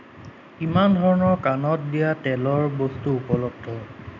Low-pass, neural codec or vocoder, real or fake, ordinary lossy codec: 7.2 kHz; none; real; none